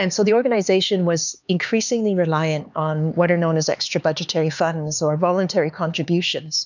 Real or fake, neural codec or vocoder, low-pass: fake; codec, 16 kHz, 4 kbps, X-Codec, HuBERT features, trained on LibriSpeech; 7.2 kHz